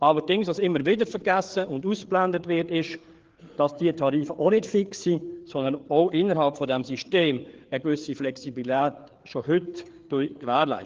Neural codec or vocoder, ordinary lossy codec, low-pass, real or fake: codec, 16 kHz, 4 kbps, FreqCodec, larger model; Opus, 16 kbps; 7.2 kHz; fake